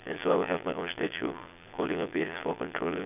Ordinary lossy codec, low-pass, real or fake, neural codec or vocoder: none; 3.6 kHz; fake; vocoder, 22.05 kHz, 80 mel bands, Vocos